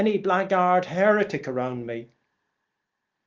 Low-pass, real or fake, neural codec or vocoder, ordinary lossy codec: 7.2 kHz; real; none; Opus, 32 kbps